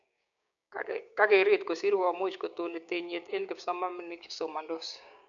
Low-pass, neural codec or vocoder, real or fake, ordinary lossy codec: 7.2 kHz; codec, 16 kHz, 6 kbps, DAC; fake; none